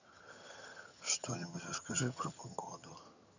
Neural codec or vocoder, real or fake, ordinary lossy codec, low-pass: vocoder, 22.05 kHz, 80 mel bands, HiFi-GAN; fake; none; 7.2 kHz